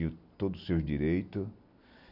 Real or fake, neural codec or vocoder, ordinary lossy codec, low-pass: real; none; none; 5.4 kHz